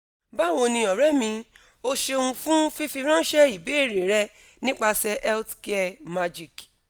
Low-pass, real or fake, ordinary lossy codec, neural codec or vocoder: none; real; none; none